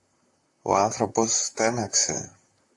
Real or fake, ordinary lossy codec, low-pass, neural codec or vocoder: fake; AAC, 64 kbps; 10.8 kHz; vocoder, 44.1 kHz, 128 mel bands, Pupu-Vocoder